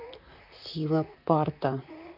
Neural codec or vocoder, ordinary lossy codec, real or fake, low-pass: vocoder, 44.1 kHz, 128 mel bands, Pupu-Vocoder; none; fake; 5.4 kHz